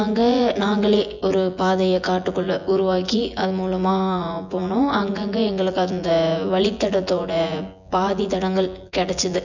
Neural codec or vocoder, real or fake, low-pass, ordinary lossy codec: vocoder, 24 kHz, 100 mel bands, Vocos; fake; 7.2 kHz; none